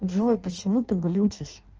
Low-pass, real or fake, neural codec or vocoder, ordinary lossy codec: 7.2 kHz; fake; codec, 16 kHz in and 24 kHz out, 0.6 kbps, FireRedTTS-2 codec; Opus, 24 kbps